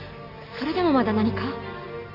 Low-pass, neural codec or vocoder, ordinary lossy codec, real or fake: 5.4 kHz; none; none; real